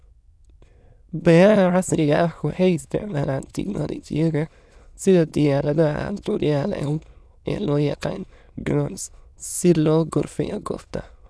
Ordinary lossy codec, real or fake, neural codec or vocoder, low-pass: none; fake; autoencoder, 22.05 kHz, a latent of 192 numbers a frame, VITS, trained on many speakers; none